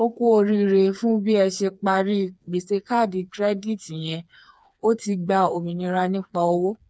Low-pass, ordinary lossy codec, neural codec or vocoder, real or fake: none; none; codec, 16 kHz, 4 kbps, FreqCodec, smaller model; fake